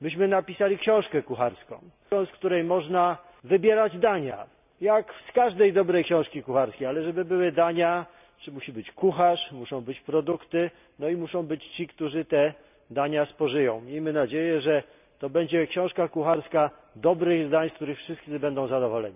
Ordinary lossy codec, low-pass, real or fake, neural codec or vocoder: none; 3.6 kHz; real; none